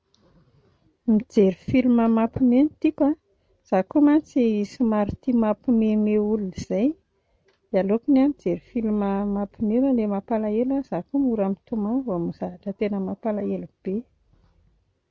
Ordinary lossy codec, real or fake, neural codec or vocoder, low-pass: none; real; none; none